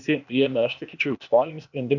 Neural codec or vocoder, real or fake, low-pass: codec, 16 kHz, 0.8 kbps, ZipCodec; fake; 7.2 kHz